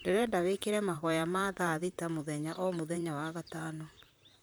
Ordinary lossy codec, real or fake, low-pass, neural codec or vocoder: none; fake; none; vocoder, 44.1 kHz, 128 mel bands, Pupu-Vocoder